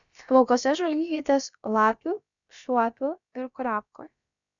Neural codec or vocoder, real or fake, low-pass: codec, 16 kHz, about 1 kbps, DyCAST, with the encoder's durations; fake; 7.2 kHz